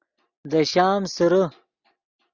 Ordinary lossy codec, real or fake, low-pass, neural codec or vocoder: Opus, 64 kbps; real; 7.2 kHz; none